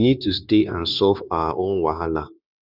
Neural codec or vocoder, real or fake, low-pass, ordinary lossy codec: codec, 16 kHz, 0.9 kbps, LongCat-Audio-Codec; fake; 5.4 kHz; none